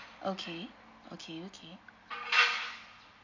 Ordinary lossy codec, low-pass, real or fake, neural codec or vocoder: MP3, 64 kbps; 7.2 kHz; real; none